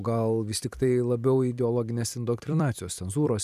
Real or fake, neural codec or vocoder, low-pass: fake; vocoder, 44.1 kHz, 128 mel bands every 256 samples, BigVGAN v2; 14.4 kHz